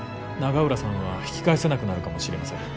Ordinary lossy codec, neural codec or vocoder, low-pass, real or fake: none; none; none; real